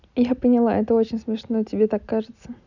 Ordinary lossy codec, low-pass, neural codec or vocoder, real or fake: none; 7.2 kHz; none; real